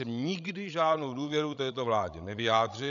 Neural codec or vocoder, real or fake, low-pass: codec, 16 kHz, 16 kbps, FreqCodec, larger model; fake; 7.2 kHz